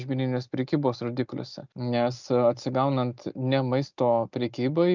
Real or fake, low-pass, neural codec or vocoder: real; 7.2 kHz; none